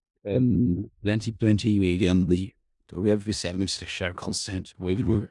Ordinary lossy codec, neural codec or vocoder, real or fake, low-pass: none; codec, 16 kHz in and 24 kHz out, 0.4 kbps, LongCat-Audio-Codec, four codebook decoder; fake; 10.8 kHz